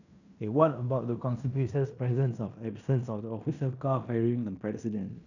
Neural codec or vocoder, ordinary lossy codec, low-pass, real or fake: codec, 16 kHz in and 24 kHz out, 0.9 kbps, LongCat-Audio-Codec, fine tuned four codebook decoder; none; 7.2 kHz; fake